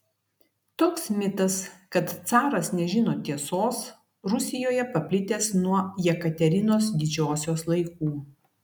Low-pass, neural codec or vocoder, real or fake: 19.8 kHz; none; real